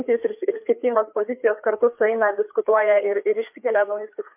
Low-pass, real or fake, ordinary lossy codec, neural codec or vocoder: 3.6 kHz; fake; MP3, 32 kbps; codec, 16 kHz, 4 kbps, FreqCodec, larger model